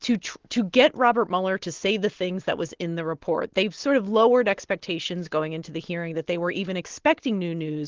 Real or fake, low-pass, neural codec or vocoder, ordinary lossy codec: real; 7.2 kHz; none; Opus, 16 kbps